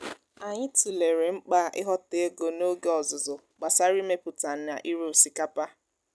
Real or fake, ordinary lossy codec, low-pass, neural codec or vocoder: real; none; none; none